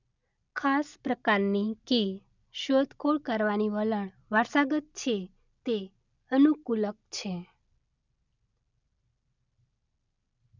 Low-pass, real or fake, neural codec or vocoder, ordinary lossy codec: 7.2 kHz; real; none; none